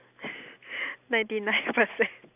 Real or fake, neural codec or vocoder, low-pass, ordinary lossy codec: real; none; 3.6 kHz; AAC, 32 kbps